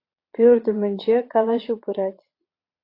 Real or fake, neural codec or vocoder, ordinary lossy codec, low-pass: fake; vocoder, 22.05 kHz, 80 mel bands, Vocos; AAC, 32 kbps; 5.4 kHz